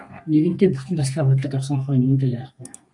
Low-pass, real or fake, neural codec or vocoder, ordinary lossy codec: 10.8 kHz; fake; codec, 32 kHz, 1.9 kbps, SNAC; AAC, 64 kbps